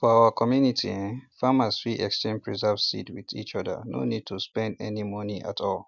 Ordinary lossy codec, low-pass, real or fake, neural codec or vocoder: none; 7.2 kHz; real; none